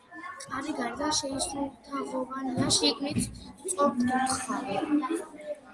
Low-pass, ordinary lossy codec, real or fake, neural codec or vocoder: 10.8 kHz; Opus, 24 kbps; real; none